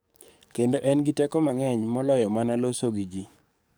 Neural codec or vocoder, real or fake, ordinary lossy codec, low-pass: codec, 44.1 kHz, 7.8 kbps, DAC; fake; none; none